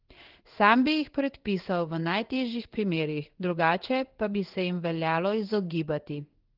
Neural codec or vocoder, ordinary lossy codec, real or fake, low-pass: codec, 16 kHz in and 24 kHz out, 1 kbps, XY-Tokenizer; Opus, 16 kbps; fake; 5.4 kHz